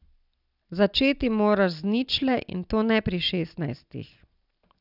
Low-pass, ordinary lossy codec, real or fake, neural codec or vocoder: 5.4 kHz; none; real; none